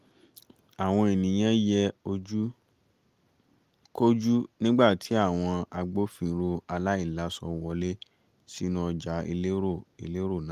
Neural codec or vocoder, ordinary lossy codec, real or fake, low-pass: none; Opus, 32 kbps; real; 14.4 kHz